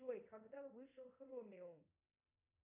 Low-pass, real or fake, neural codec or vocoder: 3.6 kHz; fake; codec, 24 kHz, 0.5 kbps, DualCodec